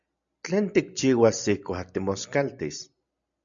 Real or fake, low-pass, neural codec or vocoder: real; 7.2 kHz; none